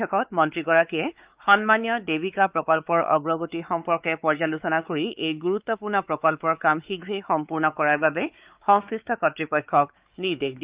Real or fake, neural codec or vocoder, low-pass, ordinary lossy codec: fake; codec, 16 kHz, 4 kbps, X-Codec, WavLM features, trained on Multilingual LibriSpeech; 3.6 kHz; Opus, 24 kbps